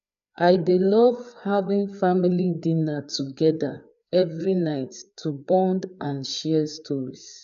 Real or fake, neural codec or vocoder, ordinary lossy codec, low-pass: fake; codec, 16 kHz, 4 kbps, FreqCodec, larger model; none; 7.2 kHz